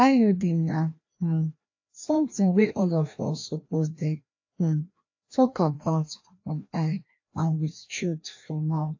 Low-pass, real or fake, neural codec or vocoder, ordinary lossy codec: 7.2 kHz; fake; codec, 16 kHz, 1 kbps, FreqCodec, larger model; AAC, 48 kbps